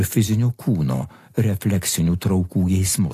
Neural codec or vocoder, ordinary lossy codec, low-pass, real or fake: none; AAC, 48 kbps; 14.4 kHz; real